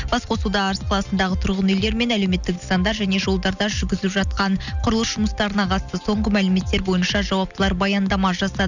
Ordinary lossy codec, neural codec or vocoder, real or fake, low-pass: none; none; real; 7.2 kHz